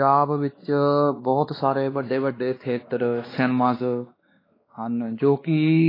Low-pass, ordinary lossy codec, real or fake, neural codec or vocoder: 5.4 kHz; AAC, 24 kbps; fake; codec, 16 kHz, 2 kbps, X-Codec, WavLM features, trained on Multilingual LibriSpeech